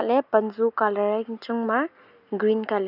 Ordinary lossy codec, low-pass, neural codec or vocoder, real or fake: none; 5.4 kHz; none; real